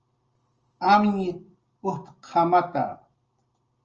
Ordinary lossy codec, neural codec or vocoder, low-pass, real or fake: Opus, 24 kbps; none; 7.2 kHz; real